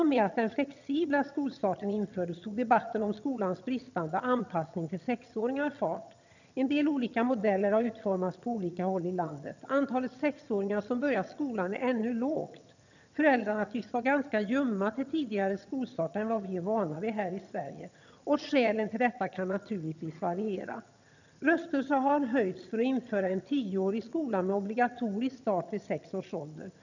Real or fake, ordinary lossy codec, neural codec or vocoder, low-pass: fake; none; vocoder, 22.05 kHz, 80 mel bands, HiFi-GAN; 7.2 kHz